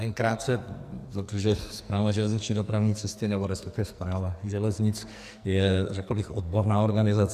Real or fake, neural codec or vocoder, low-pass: fake; codec, 44.1 kHz, 2.6 kbps, SNAC; 14.4 kHz